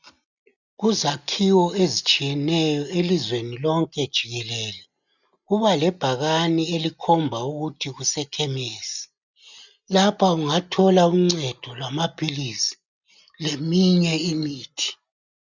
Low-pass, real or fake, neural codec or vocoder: 7.2 kHz; real; none